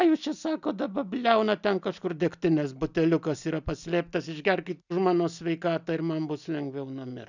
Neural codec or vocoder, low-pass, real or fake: none; 7.2 kHz; real